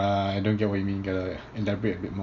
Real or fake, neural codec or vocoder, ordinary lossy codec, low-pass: real; none; none; 7.2 kHz